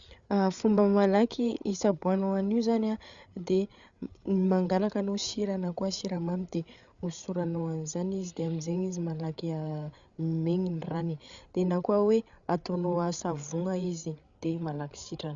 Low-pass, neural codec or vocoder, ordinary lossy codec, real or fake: 7.2 kHz; codec, 16 kHz, 8 kbps, FreqCodec, larger model; Opus, 64 kbps; fake